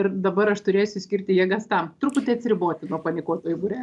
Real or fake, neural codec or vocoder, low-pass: real; none; 10.8 kHz